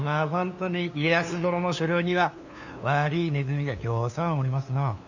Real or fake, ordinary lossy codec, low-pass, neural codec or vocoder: fake; none; 7.2 kHz; codec, 16 kHz, 2 kbps, FunCodec, trained on LibriTTS, 25 frames a second